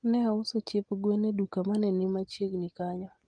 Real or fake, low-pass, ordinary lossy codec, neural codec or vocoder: real; 9.9 kHz; Opus, 24 kbps; none